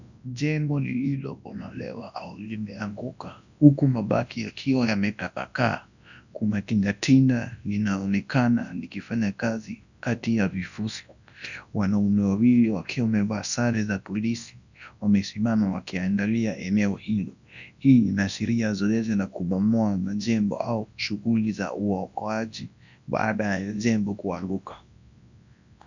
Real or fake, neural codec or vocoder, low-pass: fake; codec, 24 kHz, 0.9 kbps, WavTokenizer, large speech release; 7.2 kHz